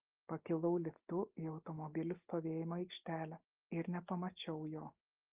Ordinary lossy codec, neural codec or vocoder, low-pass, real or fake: Opus, 32 kbps; none; 3.6 kHz; real